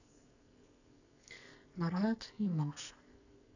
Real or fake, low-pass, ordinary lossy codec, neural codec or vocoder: fake; 7.2 kHz; none; codec, 44.1 kHz, 2.6 kbps, SNAC